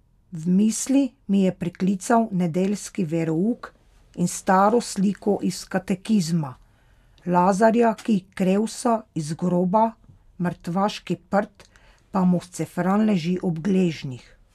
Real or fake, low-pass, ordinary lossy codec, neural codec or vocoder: real; 14.4 kHz; none; none